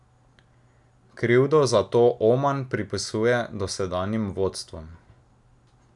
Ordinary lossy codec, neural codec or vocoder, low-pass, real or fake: none; none; 10.8 kHz; real